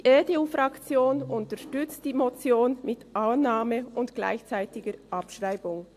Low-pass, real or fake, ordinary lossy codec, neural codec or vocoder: 14.4 kHz; real; AAC, 64 kbps; none